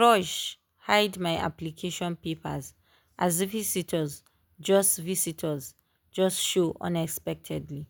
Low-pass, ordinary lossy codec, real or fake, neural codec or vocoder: none; none; real; none